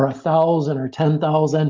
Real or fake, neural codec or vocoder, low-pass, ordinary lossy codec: real; none; 7.2 kHz; Opus, 32 kbps